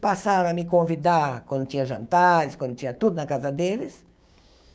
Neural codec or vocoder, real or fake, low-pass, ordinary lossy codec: codec, 16 kHz, 6 kbps, DAC; fake; none; none